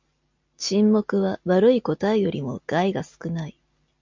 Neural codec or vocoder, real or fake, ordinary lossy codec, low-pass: none; real; MP3, 64 kbps; 7.2 kHz